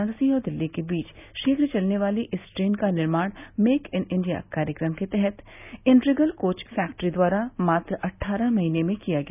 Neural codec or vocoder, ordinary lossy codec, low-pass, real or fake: none; none; 3.6 kHz; real